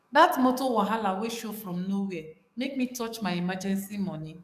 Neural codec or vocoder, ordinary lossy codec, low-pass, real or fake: codec, 44.1 kHz, 7.8 kbps, DAC; none; 14.4 kHz; fake